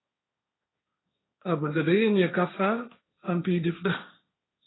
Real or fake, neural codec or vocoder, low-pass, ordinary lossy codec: fake; codec, 16 kHz, 1.1 kbps, Voila-Tokenizer; 7.2 kHz; AAC, 16 kbps